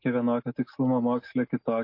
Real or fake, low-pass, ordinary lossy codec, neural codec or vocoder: real; 5.4 kHz; MP3, 32 kbps; none